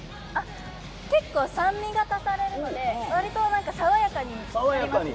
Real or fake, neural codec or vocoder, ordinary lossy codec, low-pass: real; none; none; none